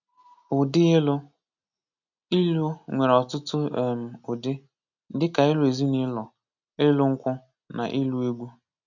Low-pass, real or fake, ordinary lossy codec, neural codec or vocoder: 7.2 kHz; real; none; none